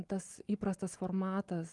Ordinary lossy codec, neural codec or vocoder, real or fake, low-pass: Opus, 24 kbps; none; real; 10.8 kHz